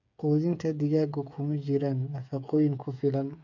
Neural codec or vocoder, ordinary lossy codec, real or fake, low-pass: codec, 16 kHz, 8 kbps, FreqCodec, smaller model; none; fake; 7.2 kHz